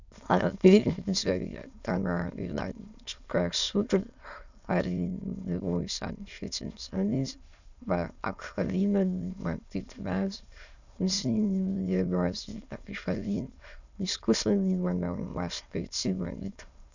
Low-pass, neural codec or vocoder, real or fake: 7.2 kHz; autoencoder, 22.05 kHz, a latent of 192 numbers a frame, VITS, trained on many speakers; fake